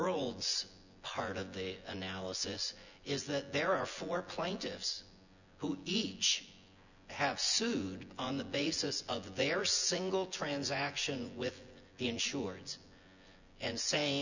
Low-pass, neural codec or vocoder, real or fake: 7.2 kHz; vocoder, 24 kHz, 100 mel bands, Vocos; fake